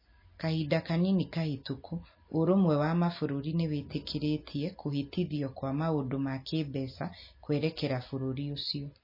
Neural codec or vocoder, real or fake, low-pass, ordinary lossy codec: none; real; 5.4 kHz; MP3, 24 kbps